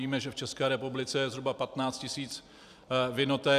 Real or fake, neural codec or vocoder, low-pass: fake; vocoder, 44.1 kHz, 128 mel bands every 256 samples, BigVGAN v2; 14.4 kHz